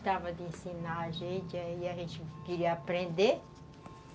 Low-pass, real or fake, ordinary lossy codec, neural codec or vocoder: none; real; none; none